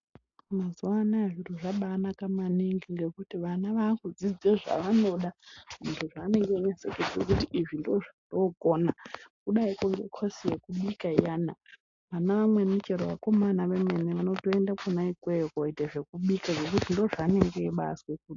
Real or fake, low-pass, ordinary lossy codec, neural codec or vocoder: real; 7.2 kHz; AAC, 48 kbps; none